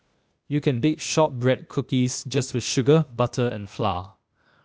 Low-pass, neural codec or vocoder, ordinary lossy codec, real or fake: none; codec, 16 kHz, 0.8 kbps, ZipCodec; none; fake